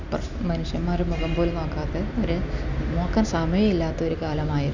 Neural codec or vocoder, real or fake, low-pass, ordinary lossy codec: none; real; 7.2 kHz; none